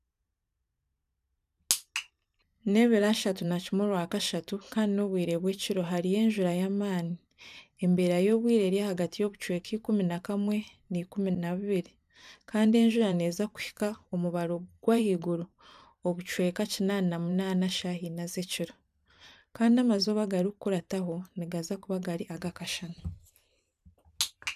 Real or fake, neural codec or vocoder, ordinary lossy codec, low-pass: fake; vocoder, 44.1 kHz, 128 mel bands every 512 samples, BigVGAN v2; none; 14.4 kHz